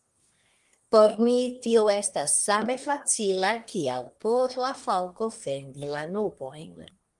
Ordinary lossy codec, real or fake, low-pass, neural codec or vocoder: Opus, 32 kbps; fake; 10.8 kHz; codec, 24 kHz, 1 kbps, SNAC